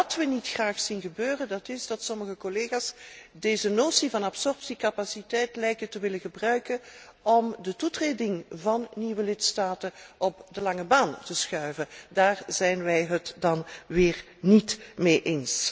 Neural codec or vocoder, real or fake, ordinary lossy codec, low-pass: none; real; none; none